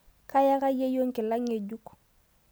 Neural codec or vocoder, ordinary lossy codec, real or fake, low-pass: none; none; real; none